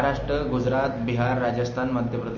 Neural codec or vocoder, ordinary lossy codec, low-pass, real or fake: none; MP3, 32 kbps; 7.2 kHz; real